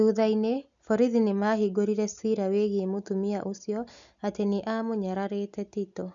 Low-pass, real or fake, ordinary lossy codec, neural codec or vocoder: 7.2 kHz; real; none; none